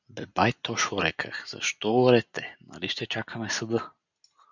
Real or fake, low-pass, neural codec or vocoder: real; 7.2 kHz; none